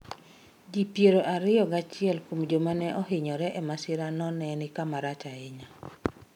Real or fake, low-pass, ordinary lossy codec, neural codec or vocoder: real; 19.8 kHz; none; none